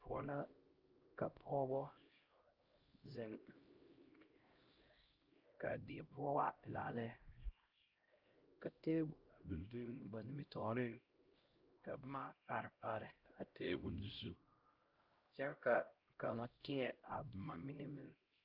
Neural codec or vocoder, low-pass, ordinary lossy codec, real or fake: codec, 16 kHz, 0.5 kbps, X-Codec, HuBERT features, trained on LibriSpeech; 5.4 kHz; Opus, 32 kbps; fake